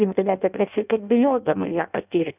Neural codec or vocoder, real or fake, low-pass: codec, 16 kHz in and 24 kHz out, 0.6 kbps, FireRedTTS-2 codec; fake; 3.6 kHz